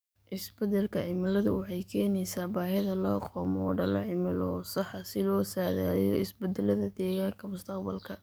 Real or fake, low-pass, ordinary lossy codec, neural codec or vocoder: fake; none; none; codec, 44.1 kHz, 7.8 kbps, DAC